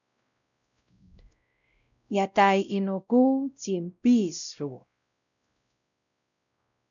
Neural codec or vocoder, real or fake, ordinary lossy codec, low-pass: codec, 16 kHz, 0.5 kbps, X-Codec, WavLM features, trained on Multilingual LibriSpeech; fake; MP3, 96 kbps; 7.2 kHz